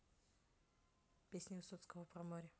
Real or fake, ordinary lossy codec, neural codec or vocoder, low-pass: real; none; none; none